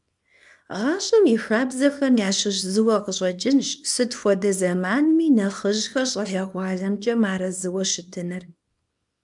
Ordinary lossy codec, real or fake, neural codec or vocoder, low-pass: MP3, 96 kbps; fake; codec, 24 kHz, 0.9 kbps, WavTokenizer, small release; 10.8 kHz